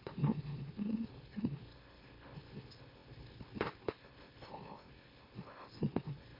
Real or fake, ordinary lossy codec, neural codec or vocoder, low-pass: fake; MP3, 24 kbps; autoencoder, 44.1 kHz, a latent of 192 numbers a frame, MeloTTS; 5.4 kHz